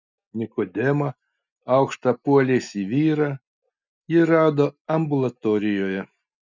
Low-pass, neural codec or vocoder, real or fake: 7.2 kHz; none; real